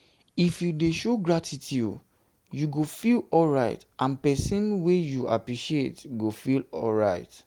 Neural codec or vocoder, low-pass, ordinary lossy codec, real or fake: none; 19.8 kHz; Opus, 24 kbps; real